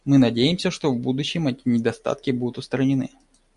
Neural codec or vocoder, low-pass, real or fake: none; 10.8 kHz; real